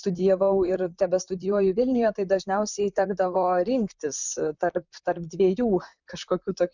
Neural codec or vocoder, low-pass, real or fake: vocoder, 44.1 kHz, 128 mel bands, Pupu-Vocoder; 7.2 kHz; fake